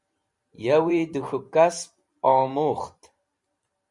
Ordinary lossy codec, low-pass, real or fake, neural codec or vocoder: Opus, 64 kbps; 10.8 kHz; fake; vocoder, 44.1 kHz, 128 mel bands every 512 samples, BigVGAN v2